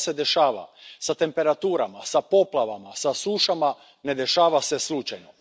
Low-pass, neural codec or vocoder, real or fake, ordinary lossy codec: none; none; real; none